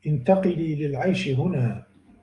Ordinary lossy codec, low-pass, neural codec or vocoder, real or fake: Opus, 64 kbps; 10.8 kHz; codec, 44.1 kHz, 7.8 kbps, DAC; fake